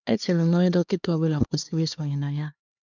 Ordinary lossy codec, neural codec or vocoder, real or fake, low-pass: none; codec, 16 kHz, 2 kbps, X-Codec, HuBERT features, trained on LibriSpeech; fake; 7.2 kHz